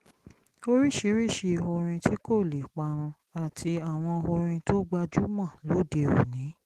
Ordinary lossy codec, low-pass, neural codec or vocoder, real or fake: Opus, 16 kbps; 14.4 kHz; autoencoder, 48 kHz, 128 numbers a frame, DAC-VAE, trained on Japanese speech; fake